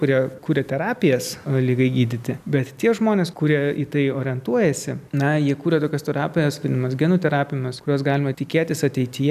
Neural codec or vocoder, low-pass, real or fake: none; 14.4 kHz; real